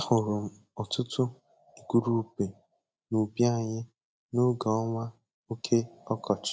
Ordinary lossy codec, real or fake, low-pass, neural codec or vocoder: none; real; none; none